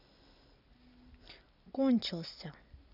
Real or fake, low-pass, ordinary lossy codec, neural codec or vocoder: real; 5.4 kHz; none; none